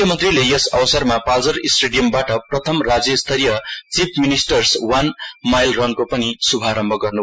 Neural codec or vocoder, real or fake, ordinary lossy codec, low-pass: none; real; none; none